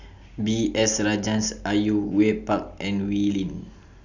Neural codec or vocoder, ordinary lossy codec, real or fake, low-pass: none; none; real; 7.2 kHz